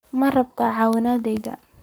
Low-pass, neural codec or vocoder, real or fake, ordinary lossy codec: none; codec, 44.1 kHz, 7.8 kbps, Pupu-Codec; fake; none